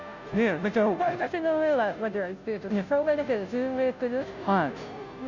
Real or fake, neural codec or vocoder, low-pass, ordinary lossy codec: fake; codec, 16 kHz, 0.5 kbps, FunCodec, trained on Chinese and English, 25 frames a second; 7.2 kHz; none